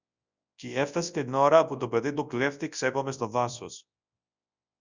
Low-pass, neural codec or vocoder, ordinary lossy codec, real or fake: 7.2 kHz; codec, 24 kHz, 0.9 kbps, WavTokenizer, large speech release; Opus, 64 kbps; fake